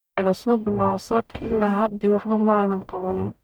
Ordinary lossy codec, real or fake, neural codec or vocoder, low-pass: none; fake; codec, 44.1 kHz, 0.9 kbps, DAC; none